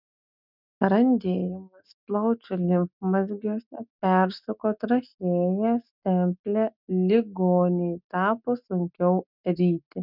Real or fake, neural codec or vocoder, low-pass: real; none; 5.4 kHz